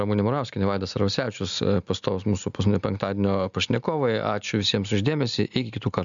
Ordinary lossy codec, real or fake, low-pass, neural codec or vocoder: MP3, 96 kbps; real; 7.2 kHz; none